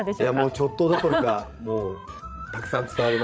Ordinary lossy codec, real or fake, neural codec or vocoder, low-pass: none; fake; codec, 16 kHz, 16 kbps, FreqCodec, larger model; none